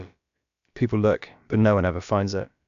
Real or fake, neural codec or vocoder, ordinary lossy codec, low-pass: fake; codec, 16 kHz, about 1 kbps, DyCAST, with the encoder's durations; MP3, 96 kbps; 7.2 kHz